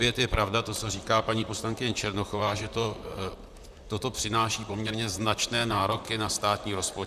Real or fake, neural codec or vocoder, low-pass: fake; vocoder, 44.1 kHz, 128 mel bands, Pupu-Vocoder; 14.4 kHz